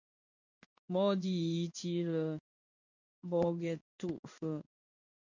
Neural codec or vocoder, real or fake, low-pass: codec, 16 kHz in and 24 kHz out, 1 kbps, XY-Tokenizer; fake; 7.2 kHz